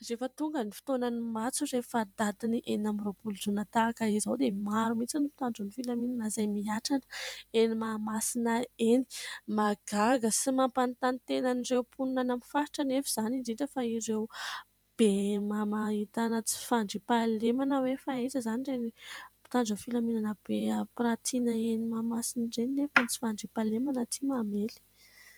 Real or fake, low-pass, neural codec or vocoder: fake; 19.8 kHz; vocoder, 44.1 kHz, 128 mel bands every 512 samples, BigVGAN v2